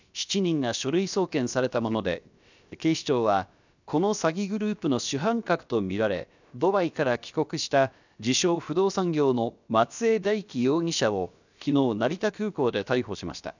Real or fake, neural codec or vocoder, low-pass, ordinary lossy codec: fake; codec, 16 kHz, about 1 kbps, DyCAST, with the encoder's durations; 7.2 kHz; none